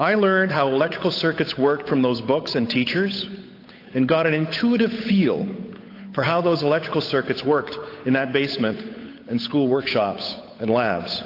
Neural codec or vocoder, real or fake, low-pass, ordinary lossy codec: codec, 16 kHz, 8 kbps, FunCodec, trained on Chinese and English, 25 frames a second; fake; 5.4 kHz; AAC, 32 kbps